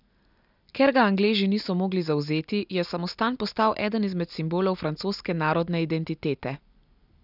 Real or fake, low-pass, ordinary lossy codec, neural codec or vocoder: real; 5.4 kHz; none; none